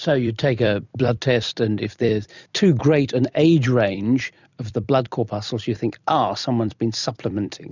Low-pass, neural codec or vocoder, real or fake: 7.2 kHz; vocoder, 44.1 kHz, 128 mel bands every 256 samples, BigVGAN v2; fake